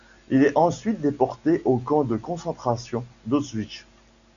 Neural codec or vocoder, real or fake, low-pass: none; real; 7.2 kHz